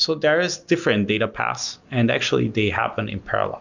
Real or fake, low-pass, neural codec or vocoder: real; 7.2 kHz; none